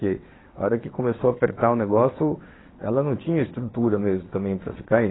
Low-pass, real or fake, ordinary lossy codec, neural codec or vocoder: 7.2 kHz; fake; AAC, 16 kbps; vocoder, 22.05 kHz, 80 mel bands, WaveNeXt